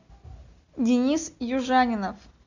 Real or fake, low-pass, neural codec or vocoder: real; 7.2 kHz; none